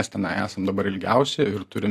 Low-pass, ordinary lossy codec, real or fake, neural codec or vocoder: 14.4 kHz; AAC, 64 kbps; real; none